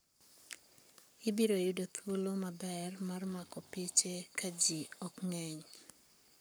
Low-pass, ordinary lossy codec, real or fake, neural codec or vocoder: none; none; fake; codec, 44.1 kHz, 7.8 kbps, Pupu-Codec